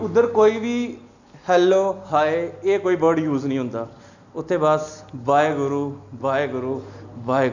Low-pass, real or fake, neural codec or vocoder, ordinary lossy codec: 7.2 kHz; real; none; none